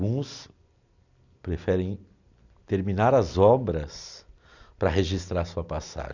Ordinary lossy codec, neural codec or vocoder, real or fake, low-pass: none; none; real; 7.2 kHz